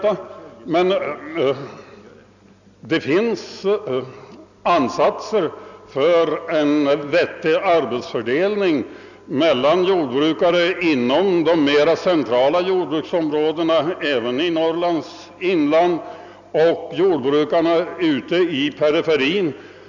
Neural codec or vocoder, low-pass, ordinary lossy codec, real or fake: none; 7.2 kHz; none; real